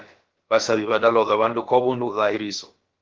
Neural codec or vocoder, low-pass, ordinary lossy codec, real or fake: codec, 16 kHz, about 1 kbps, DyCAST, with the encoder's durations; 7.2 kHz; Opus, 16 kbps; fake